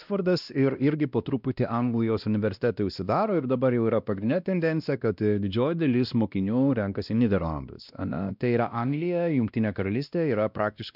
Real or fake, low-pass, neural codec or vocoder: fake; 5.4 kHz; codec, 16 kHz, 1 kbps, X-Codec, WavLM features, trained on Multilingual LibriSpeech